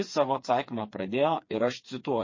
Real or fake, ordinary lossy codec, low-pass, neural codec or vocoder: fake; MP3, 32 kbps; 7.2 kHz; codec, 16 kHz, 4 kbps, FreqCodec, smaller model